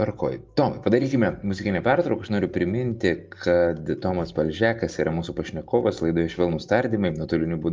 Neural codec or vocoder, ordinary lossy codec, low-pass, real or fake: none; Opus, 24 kbps; 7.2 kHz; real